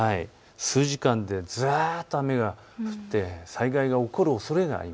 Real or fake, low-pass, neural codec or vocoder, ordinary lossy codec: real; none; none; none